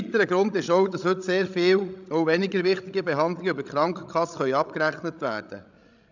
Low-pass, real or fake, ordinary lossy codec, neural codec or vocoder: 7.2 kHz; fake; none; codec, 16 kHz, 16 kbps, FreqCodec, larger model